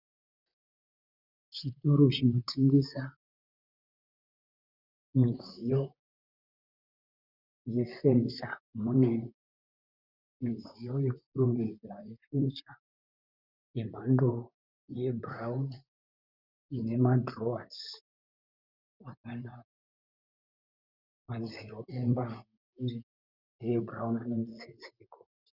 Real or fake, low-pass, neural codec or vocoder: fake; 5.4 kHz; vocoder, 44.1 kHz, 128 mel bands, Pupu-Vocoder